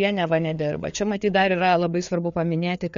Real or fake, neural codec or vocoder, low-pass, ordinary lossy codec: fake; codec, 16 kHz, 4 kbps, FreqCodec, larger model; 7.2 kHz; MP3, 48 kbps